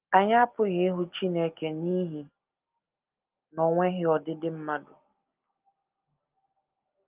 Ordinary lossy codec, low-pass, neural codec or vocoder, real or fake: Opus, 24 kbps; 3.6 kHz; none; real